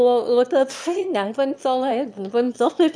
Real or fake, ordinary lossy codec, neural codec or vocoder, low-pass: fake; none; autoencoder, 22.05 kHz, a latent of 192 numbers a frame, VITS, trained on one speaker; none